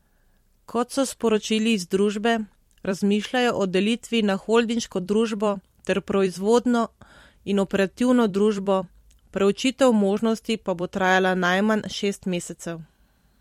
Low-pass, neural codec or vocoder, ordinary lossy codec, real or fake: 19.8 kHz; vocoder, 44.1 kHz, 128 mel bands every 256 samples, BigVGAN v2; MP3, 64 kbps; fake